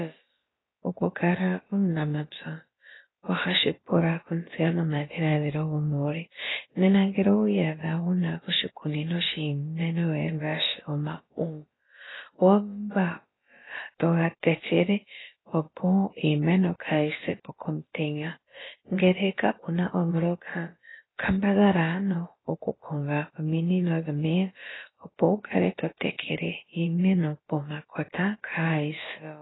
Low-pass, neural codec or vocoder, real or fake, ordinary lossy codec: 7.2 kHz; codec, 16 kHz, about 1 kbps, DyCAST, with the encoder's durations; fake; AAC, 16 kbps